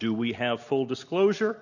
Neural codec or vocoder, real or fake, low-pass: none; real; 7.2 kHz